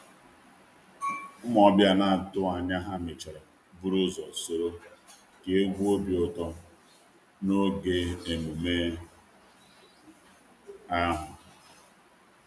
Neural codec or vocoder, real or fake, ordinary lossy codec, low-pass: none; real; none; none